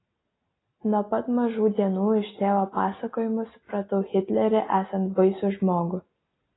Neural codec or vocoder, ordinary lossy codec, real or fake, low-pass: none; AAC, 16 kbps; real; 7.2 kHz